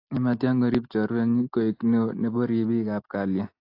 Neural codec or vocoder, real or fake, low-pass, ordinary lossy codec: none; real; 5.4 kHz; AAC, 32 kbps